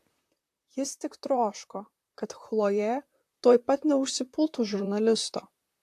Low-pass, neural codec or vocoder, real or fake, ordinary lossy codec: 14.4 kHz; vocoder, 44.1 kHz, 128 mel bands, Pupu-Vocoder; fake; AAC, 64 kbps